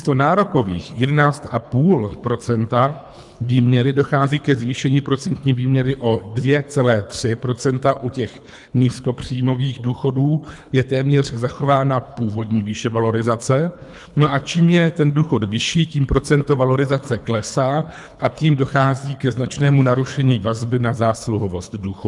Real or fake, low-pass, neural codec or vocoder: fake; 10.8 kHz; codec, 24 kHz, 3 kbps, HILCodec